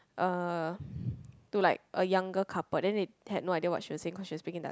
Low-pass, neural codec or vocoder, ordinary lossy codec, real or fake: none; none; none; real